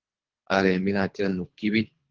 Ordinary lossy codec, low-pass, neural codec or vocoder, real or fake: Opus, 16 kbps; 7.2 kHz; codec, 24 kHz, 3 kbps, HILCodec; fake